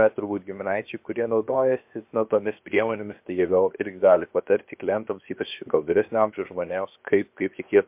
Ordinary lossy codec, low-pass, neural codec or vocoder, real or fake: MP3, 32 kbps; 3.6 kHz; codec, 16 kHz, 0.7 kbps, FocalCodec; fake